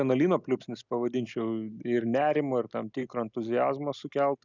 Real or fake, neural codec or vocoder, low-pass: real; none; 7.2 kHz